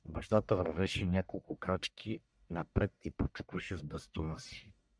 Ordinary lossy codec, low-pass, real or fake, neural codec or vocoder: MP3, 96 kbps; 9.9 kHz; fake; codec, 44.1 kHz, 1.7 kbps, Pupu-Codec